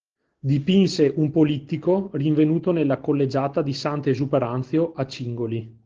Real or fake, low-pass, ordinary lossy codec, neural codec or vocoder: real; 7.2 kHz; Opus, 16 kbps; none